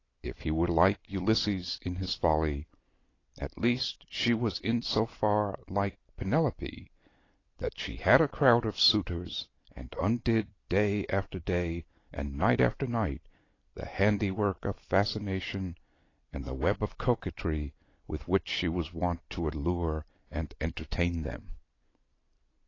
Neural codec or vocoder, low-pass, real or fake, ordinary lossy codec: none; 7.2 kHz; real; AAC, 32 kbps